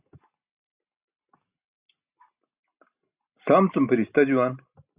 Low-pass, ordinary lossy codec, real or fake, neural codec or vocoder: 3.6 kHz; Opus, 64 kbps; real; none